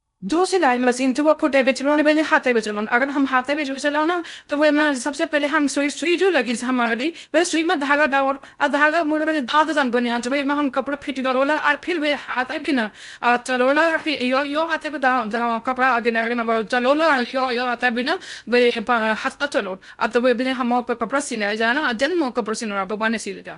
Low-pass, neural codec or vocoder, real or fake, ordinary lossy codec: 10.8 kHz; codec, 16 kHz in and 24 kHz out, 0.6 kbps, FocalCodec, streaming, 2048 codes; fake; none